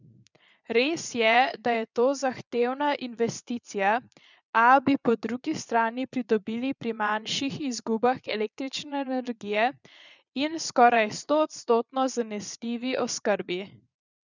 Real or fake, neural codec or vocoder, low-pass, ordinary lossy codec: fake; vocoder, 22.05 kHz, 80 mel bands, Vocos; 7.2 kHz; none